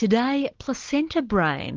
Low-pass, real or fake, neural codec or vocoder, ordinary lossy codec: 7.2 kHz; real; none; Opus, 16 kbps